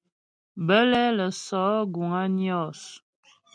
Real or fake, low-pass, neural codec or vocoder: real; 9.9 kHz; none